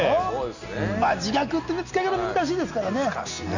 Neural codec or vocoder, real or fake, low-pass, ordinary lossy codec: none; real; 7.2 kHz; none